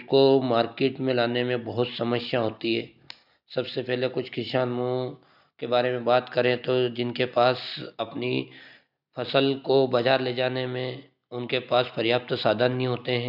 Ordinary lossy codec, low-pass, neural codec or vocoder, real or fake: none; 5.4 kHz; none; real